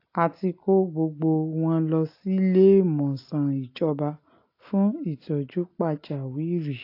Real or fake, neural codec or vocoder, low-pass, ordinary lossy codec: real; none; 5.4 kHz; AAC, 32 kbps